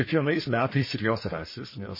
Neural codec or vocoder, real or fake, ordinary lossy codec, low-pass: codec, 44.1 kHz, 1.7 kbps, Pupu-Codec; fake; MP3, 24 kbps; 5.4 kHz